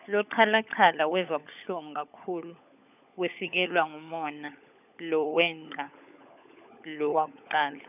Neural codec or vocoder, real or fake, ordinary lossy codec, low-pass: codec, 16 kHz, 16 kbps, FunCodec, trained on LibriTTS, 50 frames a second; fake; none; 3.6 kHz